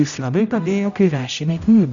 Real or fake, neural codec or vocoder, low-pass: fake; codec, 16 kHz, 0.5 kbps, X-Codec, HuBERT features, trained on general audio; 7.2 kHz